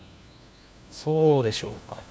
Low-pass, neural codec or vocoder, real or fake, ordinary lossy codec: none; codec, 16 kHz, 1 kbps, FunCodec, trained on LibriTTS, 50 frames a second; fake; none